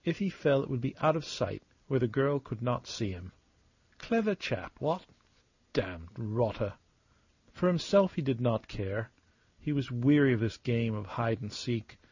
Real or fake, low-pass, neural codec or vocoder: real; 7.2 kHz; none